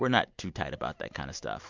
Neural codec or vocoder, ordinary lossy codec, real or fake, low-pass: none; MP3, 64 kbps; real; 7.2 kHz